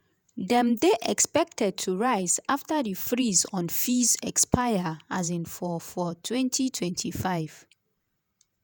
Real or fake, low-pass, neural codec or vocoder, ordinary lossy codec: fake; none; vocoder, 48 kHz, 128 mel bands, Vocos; none